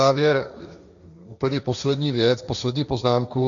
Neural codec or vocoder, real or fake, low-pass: codec, 16 kHz, 1.1 kbps, Voila-Tokenizer; fake; 7.2 kHz